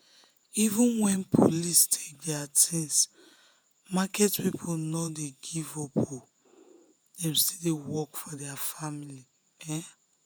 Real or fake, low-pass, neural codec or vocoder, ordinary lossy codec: fake; none; vocoder, 48 kHz, 128 mel bands, Vocos; none